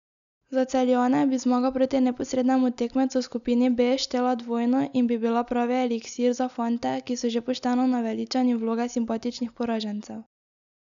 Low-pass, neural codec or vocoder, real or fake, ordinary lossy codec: 7.2 kHz; none; real; none